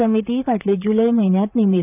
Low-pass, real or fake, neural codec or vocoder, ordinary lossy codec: 3.6 kHz; fake; vocoder, 44.1 kHz, 128 mel bands, Pupu-Vocoder; none